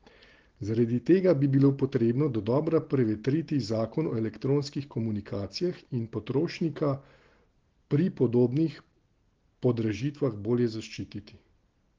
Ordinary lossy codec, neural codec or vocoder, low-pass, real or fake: Opus, 16 kbps; none; 7.2 kHz; real